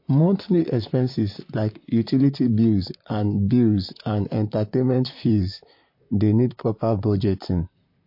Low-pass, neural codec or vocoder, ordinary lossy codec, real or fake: 5.4 kHz; vocoder, 44.1 kHz, 128 mel bands, Pupu-Vocoder; MP3, 32 kbps; fake